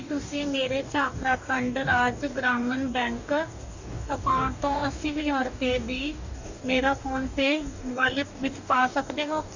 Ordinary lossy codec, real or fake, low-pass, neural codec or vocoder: none; fake; 7.2 kHz; codec, 44.1 kHz, 2.6 kbps, DAC